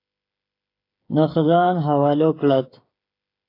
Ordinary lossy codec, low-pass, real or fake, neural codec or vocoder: AAC, 32 kbps; 5.4 kHz; fake; codec, 16 kHz, 8 kbps, FreqCodec, smaller model